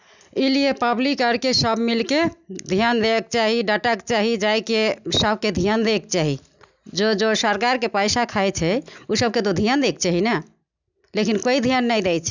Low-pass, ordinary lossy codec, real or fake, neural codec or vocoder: 7.2 kHz; none; real; none